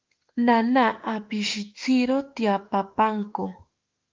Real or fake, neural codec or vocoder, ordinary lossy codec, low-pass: fake; autoencoder, 48 kHz, 32 numbers a frame, DAC-VAE, trained on Japanese speech; Opus, 32 kbps; 7.2 kHz